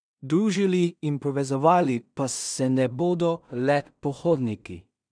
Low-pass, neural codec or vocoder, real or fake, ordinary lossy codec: 9.9 kHz; codec, 16 kHz in and 24 kHz out, 0.4 kbps, LongCat-Audio-Codec, two codebook decoder; fake; none